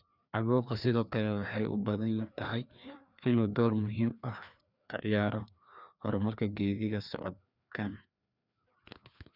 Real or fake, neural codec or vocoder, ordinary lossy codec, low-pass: fake; codec, 16 kHz, 2 kbps, FreqCodec, larger model; none; 5.4 kHz